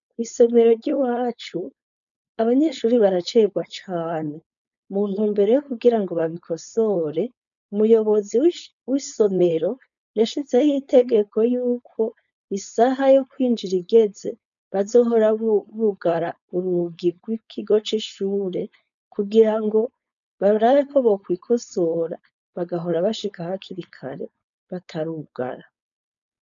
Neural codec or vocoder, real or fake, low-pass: codec, 16 kHz, 4.8 kbps, FACodec; fake; 7.2 kHz